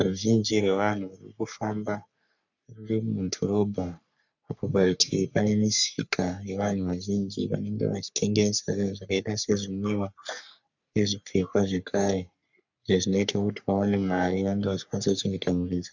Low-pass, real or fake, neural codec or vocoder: 7.2 kHz; fake; codec, 44.1 kHz, 3.4 kbps, Pupu-Codec